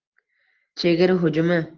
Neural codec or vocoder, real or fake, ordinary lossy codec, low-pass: none; real; Opus, 16 kbps; 7.2 kHz